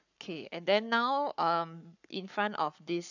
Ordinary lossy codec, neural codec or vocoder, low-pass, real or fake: none; codec, 44.1 kHz, 7.8 kbps, Pupu-Codec; 7.2 kHz; fake